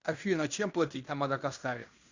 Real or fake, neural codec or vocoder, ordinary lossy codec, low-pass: fake; codec, 16 kHz in and 24 kHz out, 0.9 kbps, LongCat-Audio-Codec, fine tuned four codebook decoder; Opus, 64 kbps; 7.2 kHz